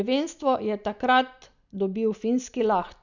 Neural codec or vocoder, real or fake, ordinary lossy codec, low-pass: none; real; none; 7.2 kHz